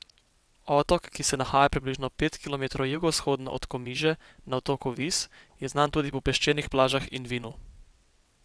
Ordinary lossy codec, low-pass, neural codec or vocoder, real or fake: none; none; vocoder, 22.05 kHz, 80 mel bands, WaveNeXt; fake